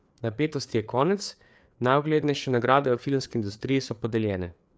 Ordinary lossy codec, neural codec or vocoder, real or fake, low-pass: none; codec, 16 kHz, 4 kbps, FreqCodec, larger model; fake; none